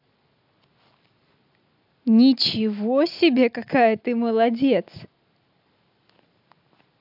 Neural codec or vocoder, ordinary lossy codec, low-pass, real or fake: none; AAC, 48 kbps; 5.4 kHz; real